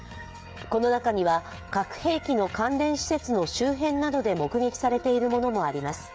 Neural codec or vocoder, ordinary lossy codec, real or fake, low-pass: codec, 16 kHz, 16 kbps, FreqCodec, smaller model; none; fake; none